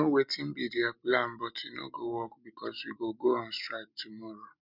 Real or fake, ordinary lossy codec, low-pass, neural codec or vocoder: real; none; 5.4 kHz; none